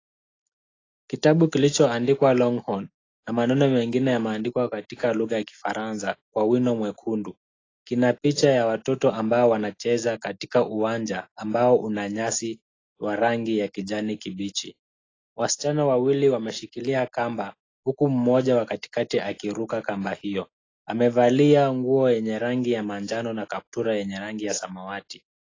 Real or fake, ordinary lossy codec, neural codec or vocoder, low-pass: real; AAC, 32 kbps; none; 7.2 kHz